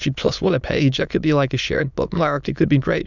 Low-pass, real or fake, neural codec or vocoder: 7.2 kHz; fake; autoencoder, 22.05 kHz, a latent of 192 numbers a frame, VITS, trained on many speakers